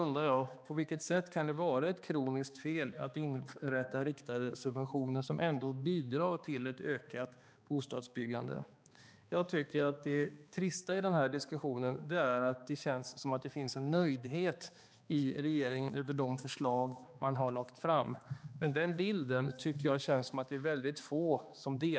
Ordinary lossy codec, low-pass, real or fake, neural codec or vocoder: none; none; fake; codec, 16 kHz, 2 kbps, X-Codec, HuBERT features, trained on balanced general audio